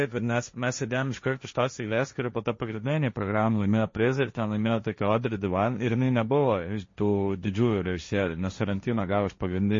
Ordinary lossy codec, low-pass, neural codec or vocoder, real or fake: MP3, 32 kbps; 7.2 kHz; codec, 16 kHz, 1.1 kbps, Voila-Tokenizer; fake